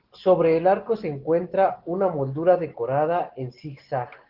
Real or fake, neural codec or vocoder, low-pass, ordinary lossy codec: real; none; 5.4 kHz; Opus, 16 kbps